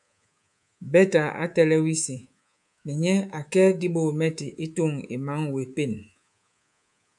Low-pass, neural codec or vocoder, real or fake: 10.8 kHz; codec, 24 kHz, 3.1 kbps, DualCodec; fake